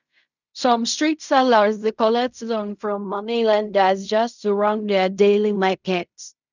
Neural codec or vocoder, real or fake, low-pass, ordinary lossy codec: codec, 16 kHz in and 24 kHz out, 0.4 kbps, LongCat-Audio-Codec, fine tuned four codebook decoder; fake; 7.2 kHz; none